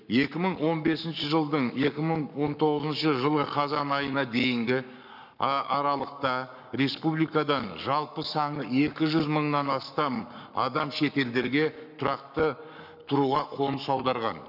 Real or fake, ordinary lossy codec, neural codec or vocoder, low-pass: fake; none; codec, 44.1 kHz, 7.8 kbps, Pupu-Codec; 5.4 kHz